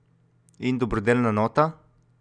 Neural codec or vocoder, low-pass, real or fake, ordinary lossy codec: none; 9.9 kHz; real; none